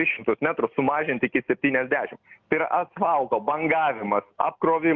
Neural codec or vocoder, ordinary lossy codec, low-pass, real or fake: none; Opus, 24 kbps; 7.2 kHz; real